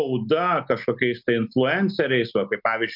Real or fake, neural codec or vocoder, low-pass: real; none; 5.4 kHz